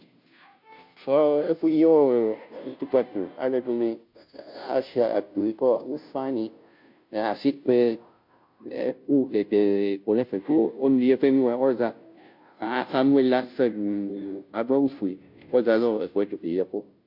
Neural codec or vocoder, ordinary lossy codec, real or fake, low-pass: codec, 16 kHz, 0.5 kbps, FunCodec, trained on Chinese and English, 25 frames a second; MP3, 48 kbps; fake; 5.4 kHz